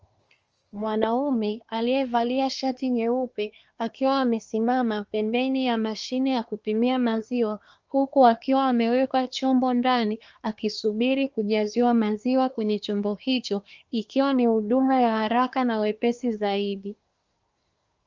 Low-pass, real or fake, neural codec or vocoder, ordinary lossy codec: 7.2 kHz; fake; codec, 24 kHz, 1 kbps, SNAC; Opus, 24 kbps